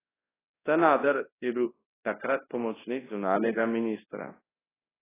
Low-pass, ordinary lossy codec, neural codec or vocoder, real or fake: 3.6 kHz; AAC, 16 kbps; codec, 24 kHz, 0.9 kbps, WavTokenizer, large speech release; fake